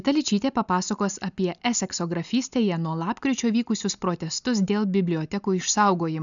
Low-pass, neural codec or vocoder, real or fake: 7.2 kHz; none; real